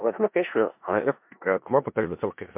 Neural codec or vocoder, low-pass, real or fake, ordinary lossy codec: codec, 16 kHz in and 24 kHz out, 0.4 kbps, LongCat-Audio-Codec, four codebook decoder; 3.6 kHz; fake; MP3, 32 kbps